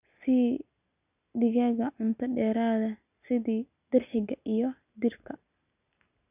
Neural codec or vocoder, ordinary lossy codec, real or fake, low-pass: none; none; real; 3.6 kHz